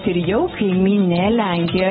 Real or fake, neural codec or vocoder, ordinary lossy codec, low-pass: real; none; AAC, 16 kbps; 7.2 kHz